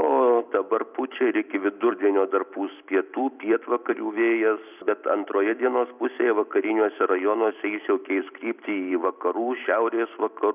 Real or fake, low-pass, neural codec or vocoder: fake; 3.6 kHz; vocoder, 44.1 kHz, 128 mel bands every 256 samples, BigVGAN v2